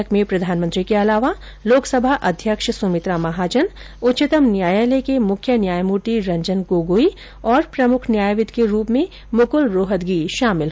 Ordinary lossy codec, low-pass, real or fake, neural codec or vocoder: none; none; real; none